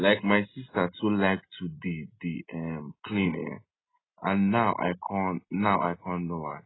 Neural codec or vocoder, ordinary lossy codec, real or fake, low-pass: none; AAC, 16 kbps; real; 7.2 kHz